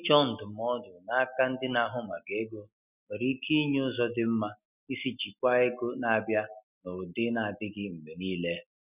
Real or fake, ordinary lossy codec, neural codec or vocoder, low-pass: real; none; none; 3.6 kHz